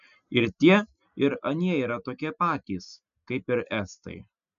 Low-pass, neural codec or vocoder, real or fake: 7.2 kHz; none; real